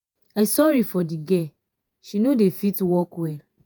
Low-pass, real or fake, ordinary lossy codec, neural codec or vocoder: none; fake; none; vocoder, 48 kHz, 128 mel bands, Vocos